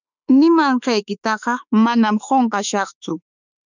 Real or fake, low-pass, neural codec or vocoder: fake; 7.2 kHz; autoencoder, 48 kHz, 32 numbers a frame, DAC-VAE, trained on Japanese speech